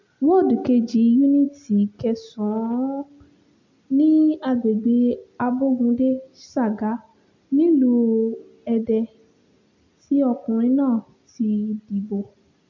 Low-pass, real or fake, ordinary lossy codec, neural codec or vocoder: 7.2 kHz; real; MP3, 64 kbps; none